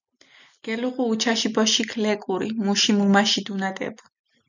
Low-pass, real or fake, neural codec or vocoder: 7.2 kHz; real; none